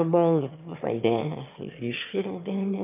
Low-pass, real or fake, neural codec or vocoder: 3.6 kHz; fake; autoencoder, 22.05 kHz, a latent of 192 numbers a frame, VITS, trained on one speaker